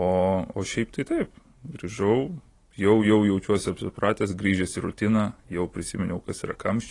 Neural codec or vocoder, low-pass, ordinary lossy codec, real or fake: none; 10.8 kHz; AAC, 32 kbps; real